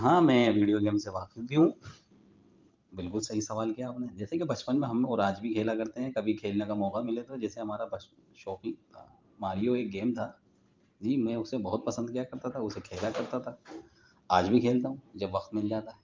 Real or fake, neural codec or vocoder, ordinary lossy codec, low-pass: fake; vocoder, 44.1 kHz, 128 mel bands every 512 samples, BigVGAN v2; Opus, 24 kbps; 7.2 kHz